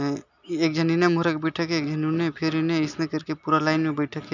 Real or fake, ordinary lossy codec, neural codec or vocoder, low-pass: real; none; none; 7.2 kHz